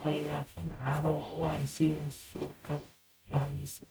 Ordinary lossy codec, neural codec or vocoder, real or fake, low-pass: none; codec, 44.1 kHz, 0.9 kbps, DAC; fake; none